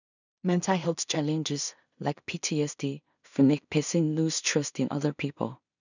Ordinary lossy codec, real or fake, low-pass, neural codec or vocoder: none; fake; 7.2 kHz; codec, 16 kHz in and 24 kHz out, 0.4 kbps, LongCat-Audio-Codec, two codebook decoder